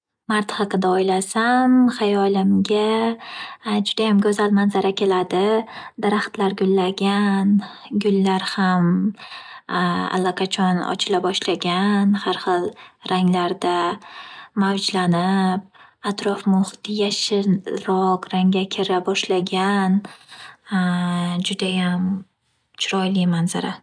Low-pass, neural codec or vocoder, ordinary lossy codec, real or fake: 9.9 kHz; none; none; real